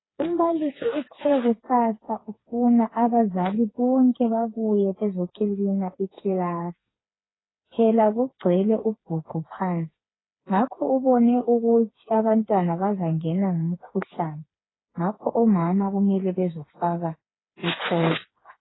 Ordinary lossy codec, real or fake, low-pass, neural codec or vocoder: AAC, 16 kbps; fake; 7.2 kHz; codec, 16 kHz, 4 kbps, FreqCodec, smaller model